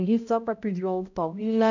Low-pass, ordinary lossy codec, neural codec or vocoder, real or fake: 7.2 kHz; none; codec, 16 kHz, 0.5 kbps, X-Codec, HuBERT features, trained on balanced general audio; fake